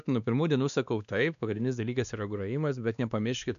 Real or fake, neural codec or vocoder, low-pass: fake; codec, 16 kHz, 2 kbps, X-Codec, WavLM features, trained on Multilingual LibriSpeech; 7.2 kHz